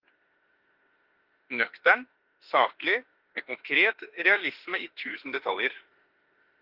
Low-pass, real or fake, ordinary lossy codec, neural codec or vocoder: 5.4 kHz; fake; Opus, 16 kbps; autoencoder, 48 kHz, 32 numbers a frame, DAC-VAE, trained on Japanese speech